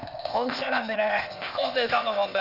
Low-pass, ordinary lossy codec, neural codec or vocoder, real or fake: 5.4 kHz; none; codec, 16 kHz, 0.8 kbps, ZipCodec; fake